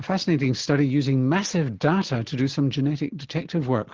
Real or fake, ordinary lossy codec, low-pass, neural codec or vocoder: real; Opus, 16 kbps; 7.2 kHz; none